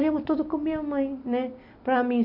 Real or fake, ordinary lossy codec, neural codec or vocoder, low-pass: real; MP3, 48 kbps; none; 5.4 kHz